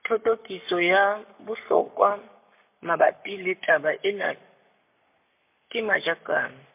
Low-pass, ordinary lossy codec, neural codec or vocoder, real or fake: 3.6 kHz; MP3, 32 kbps; codec, 44.1 kHz, 7.8 kbps, Pupu-Codec; fake